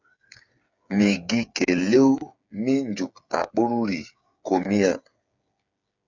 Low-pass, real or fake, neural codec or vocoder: 7.2 kHz; fake; codec, 16 kHz, 8 kbps, FreqCodec, smaller model